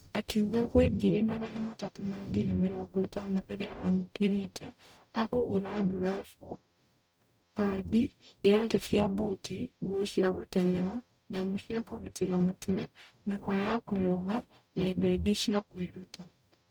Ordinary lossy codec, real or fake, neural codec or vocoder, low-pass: none; fake; codec, 44.1 kHz, 0.9 kbps, DAC; none